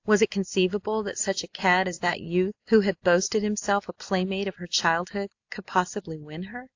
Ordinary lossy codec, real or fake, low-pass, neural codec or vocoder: AAC, 48 kbps; real; 7.2 kHz; none